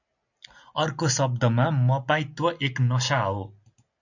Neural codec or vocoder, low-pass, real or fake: none; 7.2 kHz; real